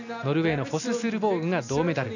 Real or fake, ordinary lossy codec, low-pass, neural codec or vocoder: real; none; 7.2 kHz; none